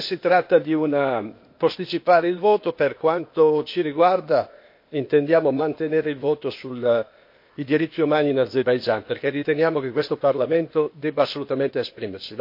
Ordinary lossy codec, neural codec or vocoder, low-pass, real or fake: MP3, 32 kbps; codec, 16 kHz, 0.8 kbps, ZipCodec; 5.4 kHz; fake